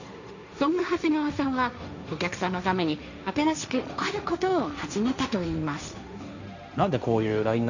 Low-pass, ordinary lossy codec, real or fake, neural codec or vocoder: 7.2 kHz; none; fake; codec, 16 kHz, 1.1 kbps, Voila-Tokenizer